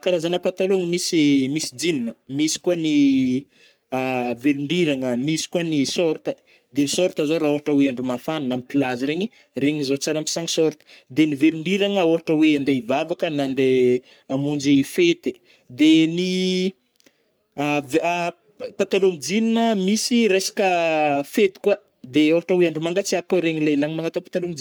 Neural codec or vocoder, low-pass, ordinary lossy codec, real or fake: codec, 44.1 kHz, 3.4 kbps, Pupu-Codec; none; none; fake